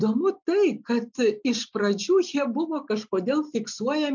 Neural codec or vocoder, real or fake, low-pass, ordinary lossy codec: none; real; 7.2 kHz; MP3, 64 kbps